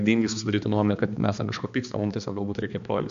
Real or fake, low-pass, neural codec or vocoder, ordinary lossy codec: fake; 7.2 kHz; codec, 16 kHz, 2 kbps, X-Codec, HuBERT features, trained on balanced general audio; MP3, 64 kbps